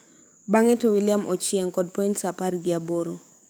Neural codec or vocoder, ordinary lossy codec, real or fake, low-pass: codec, 44.1 kHz, 7.8 kbps, DAC; none; fake; none